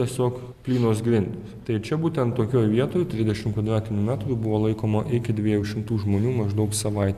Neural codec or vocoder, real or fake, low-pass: none; real; 14.4 kHz